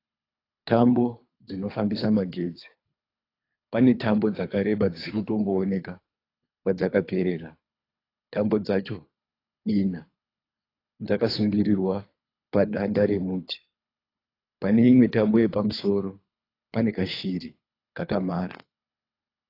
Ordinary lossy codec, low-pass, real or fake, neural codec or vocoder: AAC, 32 kbps; 5.4 kHz; fake; codec, 24 kHz, 3 kbps, HILCodec